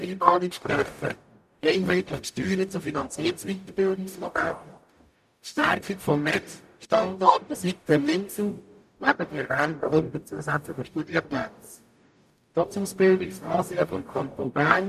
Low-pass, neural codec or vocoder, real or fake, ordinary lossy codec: 14.4 kHz; codec, 44.1 kHz, 0.9 kbps, DAC; fake; none